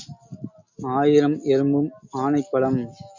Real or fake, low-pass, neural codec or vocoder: real; 7.2 kHz; none